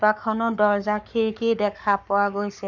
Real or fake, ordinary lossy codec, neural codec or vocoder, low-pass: fake; none; codec, 44.1 kHz, 7.8 kbps, Pupu-Codec; 7.2 kHz